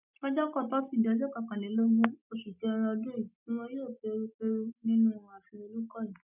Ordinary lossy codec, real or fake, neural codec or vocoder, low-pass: none; real; none; 3.6 kHz